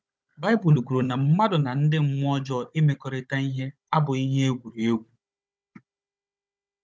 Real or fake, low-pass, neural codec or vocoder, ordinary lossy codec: fake; none; codec, 16 kHz, 16 kbps, FunCodec, trained on Chinese and English, 50 frames a second; none